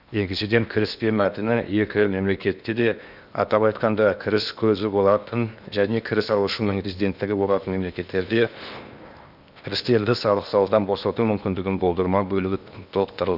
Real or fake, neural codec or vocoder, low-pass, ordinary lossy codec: fake; codec, 16 kHz in and 24 kHz out, 0.8 kbps, FocalCodec, streaming, 65536 codes; 5.4 kHz; none